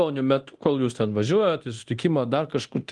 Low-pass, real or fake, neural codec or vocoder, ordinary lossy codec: 10.8 kHz; fake; codec, 24 kHz, 0.9 kbps, DualCodec; Opus, 24 kbps